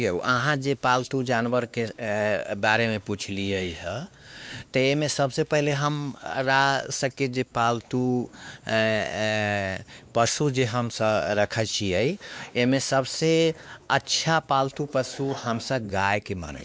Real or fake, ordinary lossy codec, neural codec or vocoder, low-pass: fake; none; codec, 16 kHz, 2 kbps, X-Codec, WavLM features, trained on Multilingual LibriSpeech; none